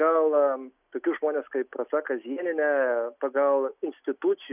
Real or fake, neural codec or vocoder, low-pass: real; none; 3.6 kHz